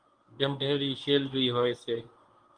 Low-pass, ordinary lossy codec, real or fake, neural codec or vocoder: 9.9 kHz; Opus, 24 kbps; fake; codec, 24 kHz, 0.9 kbps, WavTokenizer, medium speech release version 1